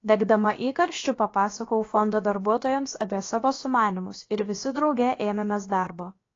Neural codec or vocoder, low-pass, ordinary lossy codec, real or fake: codec, 16 kHz, about 1 kbps, DyCAST, with the encoder's durations; 7.2 kHz; AAC, 32 kbps; fake